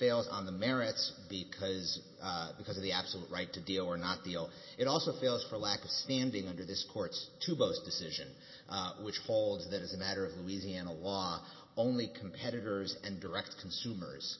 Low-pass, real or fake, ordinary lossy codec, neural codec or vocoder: 7.2 kHz; real; MP3, 24 kbps; none